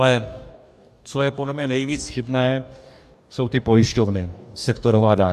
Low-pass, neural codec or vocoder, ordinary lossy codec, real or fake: 14.4 kHz; codec, 44.1 kHz, 2.6 kbps, DAC; AAC, 96 kbps; fake